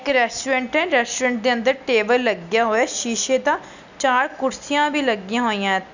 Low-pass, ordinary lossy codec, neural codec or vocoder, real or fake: 7.2 kHz; none; none; real